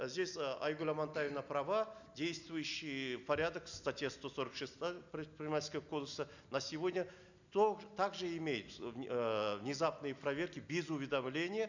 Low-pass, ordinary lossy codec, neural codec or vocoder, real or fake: 7.2 kHz; none; none; real